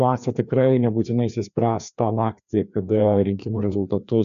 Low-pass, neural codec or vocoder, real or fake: 7.2 kHz; codec, 16 kHz, 2 kbps, FreqCodec, larger model; fake